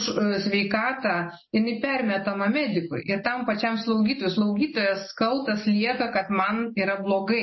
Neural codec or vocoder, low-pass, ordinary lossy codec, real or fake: none; 7.2 kHz; MP3, 24 kbps; real